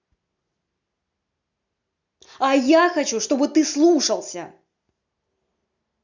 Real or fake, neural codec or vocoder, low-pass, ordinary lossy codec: real; none; 7.2 kHz; none